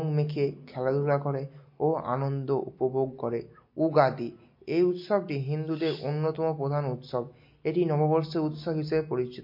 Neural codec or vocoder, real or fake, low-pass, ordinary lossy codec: none; real; 5.4 kHz; MP3, 32 kbps